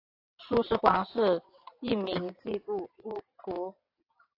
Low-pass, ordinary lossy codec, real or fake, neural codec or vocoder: 5.4 kHz; AAC, 32 kbps; fake; vocoder, 22.05 kHz, 80 mel bands, WaveNeXt